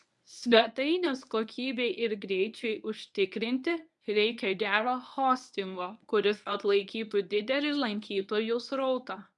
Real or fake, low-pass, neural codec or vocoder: fake; 10.8 kHz; codec, 24 kHz, 0.9 kbps, WavTokenizer, medium speech release version 1